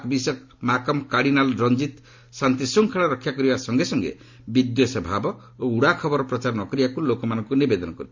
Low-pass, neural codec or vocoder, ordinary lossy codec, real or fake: 7.2 kHz; none; MP3, 48 kbps; real